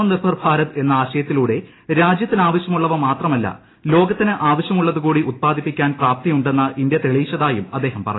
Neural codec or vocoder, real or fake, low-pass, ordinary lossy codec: none; real; 7.2 kHz; AAC, 16 kbps